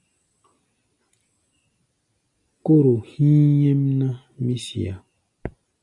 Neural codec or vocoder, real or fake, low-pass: none; real; 10.8 kHz